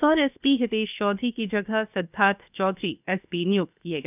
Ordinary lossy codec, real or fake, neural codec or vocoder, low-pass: none; fake; codec, 16 kHz, 0.7 kbps, FocalCodec; 3.6 kHz